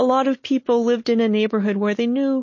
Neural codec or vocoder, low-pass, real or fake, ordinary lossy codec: none; 7.2 kHz; real; MP3, 32 kbps